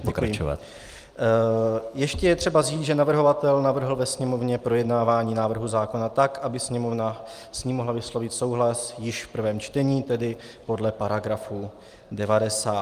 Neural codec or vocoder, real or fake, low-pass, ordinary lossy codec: none; real; 14.4 kHz; Opus, 32 kbps